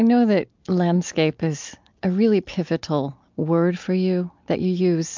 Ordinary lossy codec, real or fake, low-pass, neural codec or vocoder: MP3, 64 kbps; real; 7.2 kHz; none